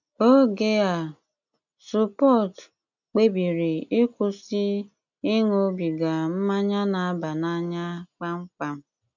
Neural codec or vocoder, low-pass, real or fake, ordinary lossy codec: none; 7.2 kHz; real; none